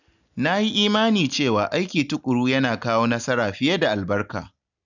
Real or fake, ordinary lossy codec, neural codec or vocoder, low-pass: real; none; none; 7.2 kHz